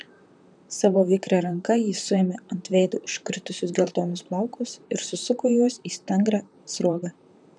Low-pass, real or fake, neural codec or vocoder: 10.8 kHz; fake; vocoder, 44.1 kHz, 128 mel bands, Pupu-Vocoder